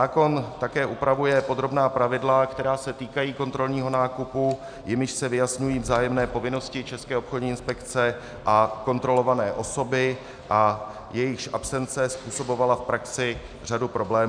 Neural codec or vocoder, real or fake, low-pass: none; real; 9.9 kHz